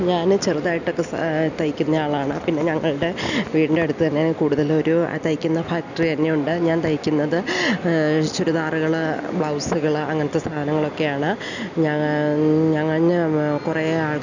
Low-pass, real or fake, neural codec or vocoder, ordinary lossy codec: 7.2 kHz; real; none; none